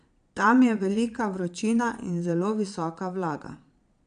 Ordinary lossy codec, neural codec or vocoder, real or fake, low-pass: none; vocoder, 22.05 kHz, 80 mel bands, Vocos; fake; 9.9 kHz